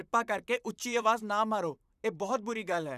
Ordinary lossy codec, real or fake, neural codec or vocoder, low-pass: none; fake; vocoder, 44.1 kHz, 128 mel bands, Pupu-Vocoder; 14.4 kHz